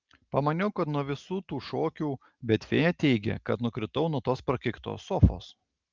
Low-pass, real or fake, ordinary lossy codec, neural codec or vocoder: 7.2 kHz; real; Opus, 24 kbps; none